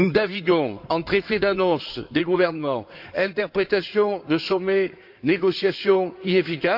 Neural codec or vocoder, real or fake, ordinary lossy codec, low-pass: codec, 16 kHz in and 24 kHz out, 2.2 kbps, FireRedTTS-2 codec; fake; AAC, 48 kbps; 5.4 kHz